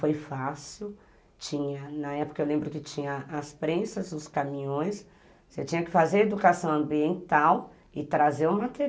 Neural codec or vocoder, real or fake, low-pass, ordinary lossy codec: none; real; none; none